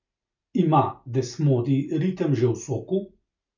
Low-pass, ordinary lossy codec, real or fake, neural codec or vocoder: 7.2 kHz; none; real; none